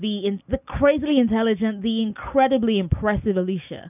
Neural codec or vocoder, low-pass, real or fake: none; 3.6 kHz; real